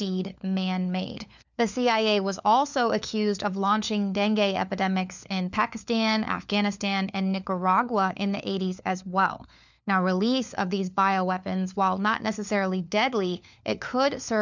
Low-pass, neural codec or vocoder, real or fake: 7.2 kHz; codec, 16 kHz, 4 kbps, FunCodec, trained on LibriTTS, 50 frames a second; fake